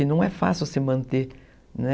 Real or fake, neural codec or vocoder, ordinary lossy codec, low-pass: real; none; none; none